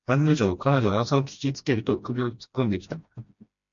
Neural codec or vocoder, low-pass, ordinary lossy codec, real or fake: codec, 16 kHz, 1 kbps, FreqCodec, smaller model; 7.2 kHz; MP3, 48 kbps; fake